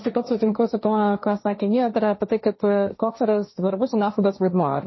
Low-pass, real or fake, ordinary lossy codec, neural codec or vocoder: 7.2 kHz; fake; MP3, 24 kbps; codec, 16 kHz, 1.1 kbps, Voila-Tokenizer